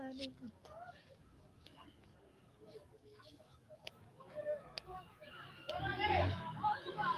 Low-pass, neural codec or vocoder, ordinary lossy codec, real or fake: 14.4 kHz; codec, 44.1 kHz, 7.8 kbps, DAC; Opus, 32 kbps; fake